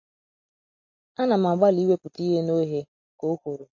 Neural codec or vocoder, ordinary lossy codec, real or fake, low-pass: none; MP3, 32 kbps; real; 7.2 kHz